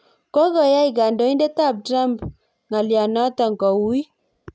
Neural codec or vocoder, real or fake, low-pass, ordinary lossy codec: none; real; none; none